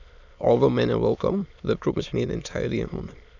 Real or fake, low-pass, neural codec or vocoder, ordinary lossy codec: fake; 7.2 kHz; autoencoder, 22.05 kHz, a latent of 192 numbers a frame, VITS, trained on many speakers; none